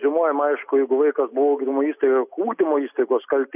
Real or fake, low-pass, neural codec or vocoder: real; 3.6 kHz; none